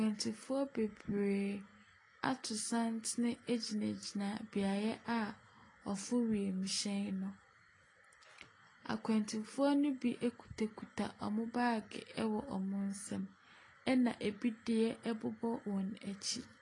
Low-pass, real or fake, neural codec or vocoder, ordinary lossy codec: 10.8 kHz; real; none; AAC, 32 kbps